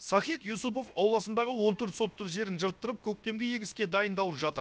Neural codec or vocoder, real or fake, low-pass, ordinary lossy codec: codec, 16 kHz, 0.7 kbps, FocalCodec; fake; none; none